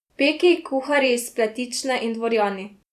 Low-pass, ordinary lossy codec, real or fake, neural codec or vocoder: 14.4 kHz; none; fake; vocoder, 44.1 kHz, 128 mel bands every 256 samples, BigVGAN v2